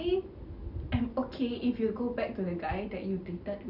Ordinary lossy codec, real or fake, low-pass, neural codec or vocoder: none; real; 5.4 kHz; none